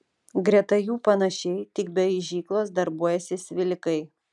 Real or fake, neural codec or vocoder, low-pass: real; none; 10.8 kHz